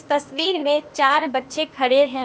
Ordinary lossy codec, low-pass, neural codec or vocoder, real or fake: none; none; codec, 16 kHz, 0.8 kbps, ZipCodec; fake